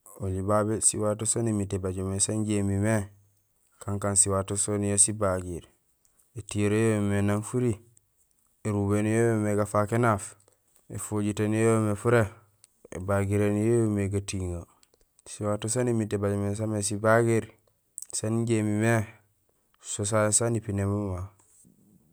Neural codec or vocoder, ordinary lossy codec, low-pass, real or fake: none; none; none; real